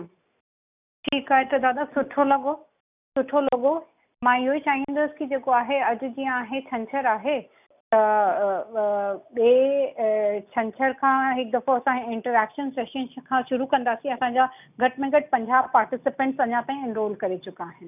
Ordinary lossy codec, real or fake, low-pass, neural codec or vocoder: none; real; 3.6 kHz; none